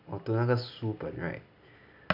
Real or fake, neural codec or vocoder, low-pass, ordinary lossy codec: real; none; 5.4 kHz; none